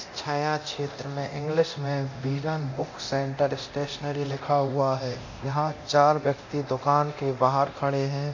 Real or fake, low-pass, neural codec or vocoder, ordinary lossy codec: fake; 7.2 kHz; codec, 24 kHz, 0.9 kbps, DualCodec; MP3, 48 kbps